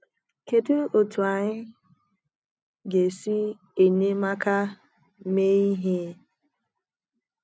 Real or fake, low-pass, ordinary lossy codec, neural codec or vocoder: real; none; none; none